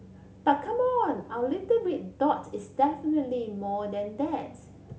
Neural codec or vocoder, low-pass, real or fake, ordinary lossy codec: none; none; real; none